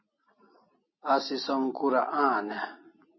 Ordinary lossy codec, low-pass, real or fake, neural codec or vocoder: MP3, 24 kbps; 7.2 kHz; real; none